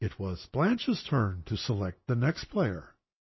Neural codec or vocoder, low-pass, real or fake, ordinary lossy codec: none; 7.2 kHz; real; MP3, 24 kbps